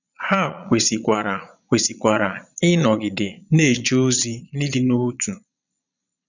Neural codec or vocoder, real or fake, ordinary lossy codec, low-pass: vocoder, 22.05 kHz, 80 mel bands, Vocos; fake; none; 7.2 kHz